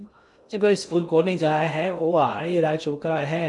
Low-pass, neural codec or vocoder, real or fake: 10.8 kHz; codec, 16 kHz in and 24 kHz out, 0.6 kbps, FocalCodec, streaming, 2048 codes; fake